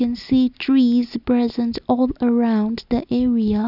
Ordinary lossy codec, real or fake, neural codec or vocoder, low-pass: none; real; none; 5.4 kHz